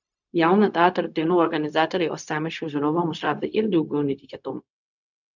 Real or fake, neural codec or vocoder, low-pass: fake; codec, 16 kHz, 0.4 kbps, LongCat-Audio-Codec; 7.2 kHz